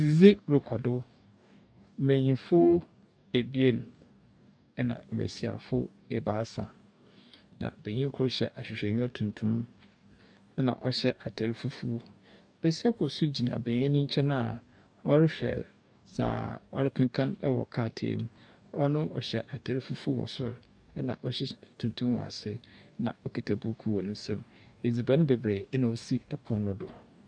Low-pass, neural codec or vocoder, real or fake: 9.9 kHz; codec, 44.1 kHz, 2.6 kbps, DAC; fake